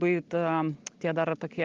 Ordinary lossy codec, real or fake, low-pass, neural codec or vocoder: Opus, 32 kbps; real; 7.2 kHz; none